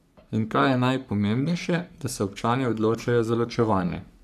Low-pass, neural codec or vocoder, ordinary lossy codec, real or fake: 14.4 kHz; codec, 44.1 kHz, 3.4 kbps, Pupu-Codec; none; fake